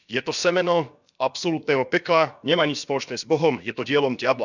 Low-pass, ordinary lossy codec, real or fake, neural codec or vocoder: 7.2 kHz; none; fake; codec, 16 kHz, about 1 kbps, DyCAST, with the encoder's durations